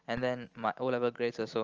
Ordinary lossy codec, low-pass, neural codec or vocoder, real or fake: Opus, 24 kbps; 7.2 kHz; none; real